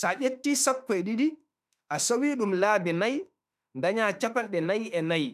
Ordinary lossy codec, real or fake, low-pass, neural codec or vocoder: none; fake; 14.4 kHz; autoencoder, 48 kHz, 32 numbers a frame, DAC-VAE, trained on Japanese speech